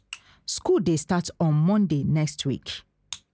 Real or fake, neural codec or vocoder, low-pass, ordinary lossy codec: real; none; none; none